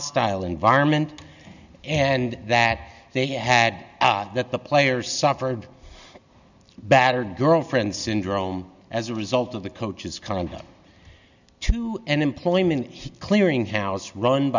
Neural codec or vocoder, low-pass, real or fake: none; 7.2 kHz; real